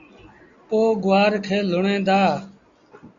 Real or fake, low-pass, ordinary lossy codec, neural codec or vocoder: real; 7.2 kHz; Opus, 64 kbps; none